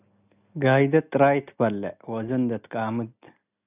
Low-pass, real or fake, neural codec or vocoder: 3.6 kHz; real; none